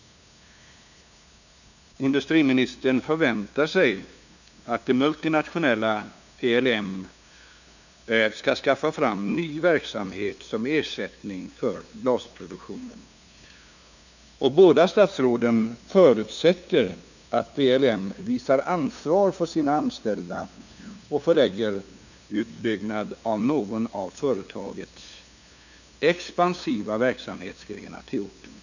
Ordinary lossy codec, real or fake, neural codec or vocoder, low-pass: none; fake; codec, 16 kHz, 2 kbps, FunCodec, trained on LibriTTS, 25 frames a second; 7.2 kHz